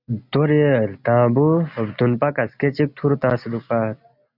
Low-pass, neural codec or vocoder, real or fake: 5.4 kHz; none; real